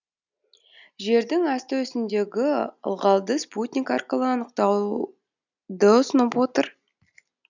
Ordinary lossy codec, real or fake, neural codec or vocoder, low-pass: none; real; none; 7.2 kHz